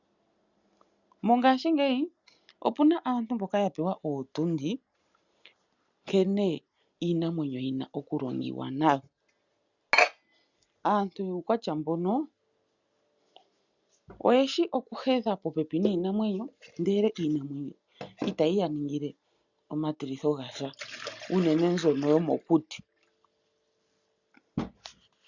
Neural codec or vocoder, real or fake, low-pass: none; real; 7.2 kHz